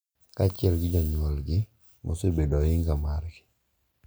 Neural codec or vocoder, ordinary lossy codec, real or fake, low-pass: none; none; real; none